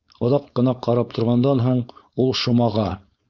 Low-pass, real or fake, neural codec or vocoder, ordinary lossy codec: 7.2 kHz; fake; codec, 16 kHz, 4.8 kbps, FACodec; Opus, 64 kbps